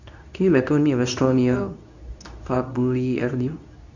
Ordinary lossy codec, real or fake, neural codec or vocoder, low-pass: none; fake; codec, 24 kHz, 0.9 kbps, WavTokenizer, medium speech release version 2; 7.2 kHz